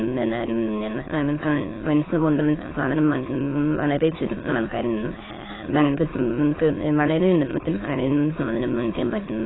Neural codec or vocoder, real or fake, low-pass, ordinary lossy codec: autoencoder, 22.05 kHz, a latent of 192 numbers a frame, VITS, trained on many speakers; fake; 7.2 kHz; AAC, 16 kbps